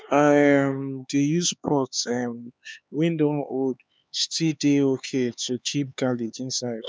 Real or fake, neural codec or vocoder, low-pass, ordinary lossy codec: fake; codec, 16 kHz, 4 kbps, X-Codec, HuBERT features, trained on LibriSpeech; none; none